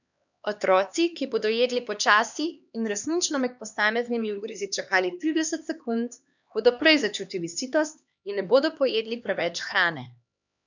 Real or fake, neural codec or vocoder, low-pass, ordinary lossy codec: fake; codec, 16 kHz, 2 kbps, X-Codec, HuBERT features, trained on LibriSpeech; 7.2 kHz; none